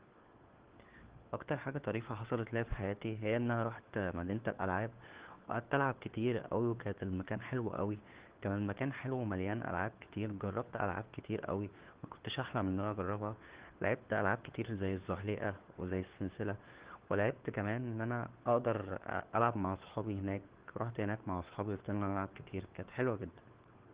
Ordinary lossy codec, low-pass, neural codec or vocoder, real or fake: Opus, 16 kbps; 3.6 kHz; codec, 16 kHz, 4 kbps, FunCodec, trained on LibriTTS, 50 frames a second; fake